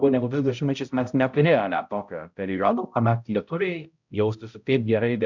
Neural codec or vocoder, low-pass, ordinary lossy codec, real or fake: codec, 16 kHz, 0.5 kbps, X-Codec, HuBERT features, trained on balanced general audio; 7.2 kHz; MP3, 64 kbps; fake